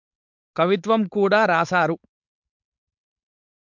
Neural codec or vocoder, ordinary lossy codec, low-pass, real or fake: codec, 16 kHz, 4.8 kbps, FACodec; MP3, 64 kbps; 7.2 kHz; fake